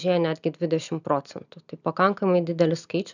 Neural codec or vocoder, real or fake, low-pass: none; real; 7.2 kHz